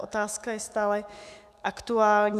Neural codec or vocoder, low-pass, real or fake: autoencoder, 48 kHz, 128 numbers a frame, DAC-VAE, trained on Japanese speech; 14.4 kHz; fake